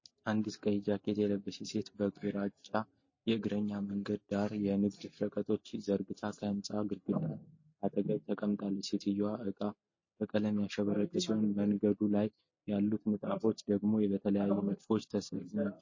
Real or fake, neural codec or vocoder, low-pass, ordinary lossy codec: real; none; 7.2 kHz; MP3, 32 kbps